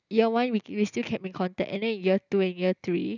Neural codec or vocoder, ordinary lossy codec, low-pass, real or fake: none; none; 7.2 kHz; real